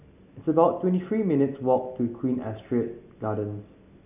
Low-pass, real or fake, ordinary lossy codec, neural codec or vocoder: 3.6 kHz; real; none; none